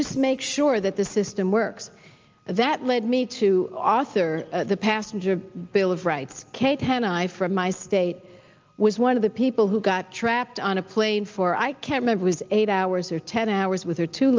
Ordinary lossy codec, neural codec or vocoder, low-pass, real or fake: Opus, 24 kbps; none; 7.2 kHz; real